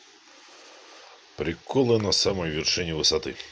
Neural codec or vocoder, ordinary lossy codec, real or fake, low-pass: none; none; real; none